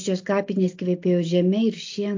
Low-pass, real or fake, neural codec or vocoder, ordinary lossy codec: 7.2 kHz; real; none; AAC, 48 kbps